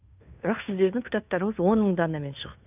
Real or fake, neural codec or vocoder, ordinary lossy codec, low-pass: fake; codec, 16 kHz, 2 kbps, FunCodec, trained on Chinese and English, 25 frames a second; none; 3.6 kHz